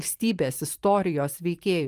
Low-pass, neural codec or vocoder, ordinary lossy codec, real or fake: 14.4 kHz; vocoder, 44.1 kHz, 128 mel bands every 512 samples, BigVGAN v2; Opus, 32 kbps; fake